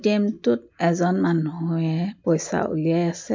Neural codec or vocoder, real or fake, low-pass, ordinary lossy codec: none; real; 7.2 kHz; MP3, 48 kbps